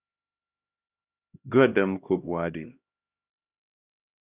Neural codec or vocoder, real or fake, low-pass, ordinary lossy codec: codec, 16 kHz, 1 kbps, X-Codec, HuBERT features, trained on LibriSpeech; fake; 3.6 kHz; Opus, 64 kbps